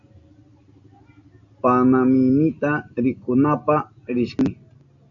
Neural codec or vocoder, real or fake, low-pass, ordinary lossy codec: none; real; 7.2 kHz; AAC, 64 kbps